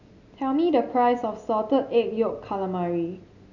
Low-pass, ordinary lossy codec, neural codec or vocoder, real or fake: 7.2 kHz; none; none; real